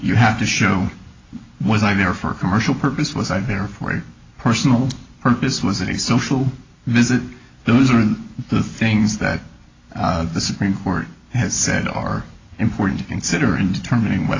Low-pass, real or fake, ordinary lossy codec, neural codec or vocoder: 7.2 kHz; real; AAC, 32 kbps; none